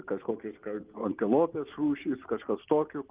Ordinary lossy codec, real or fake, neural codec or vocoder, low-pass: Opus, 24 kbps; real; none; 3.6 kHz